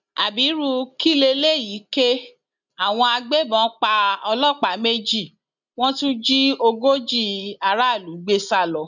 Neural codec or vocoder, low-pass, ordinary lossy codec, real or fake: none; 7.2 kHz; none; real